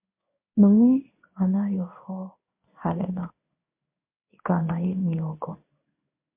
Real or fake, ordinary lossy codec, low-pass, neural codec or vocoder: fake; MP3, 32 kbps; 3.6 kHz; codec, 16 kHz in and 24 kHz out, 1 kbps, XY-Tokenizer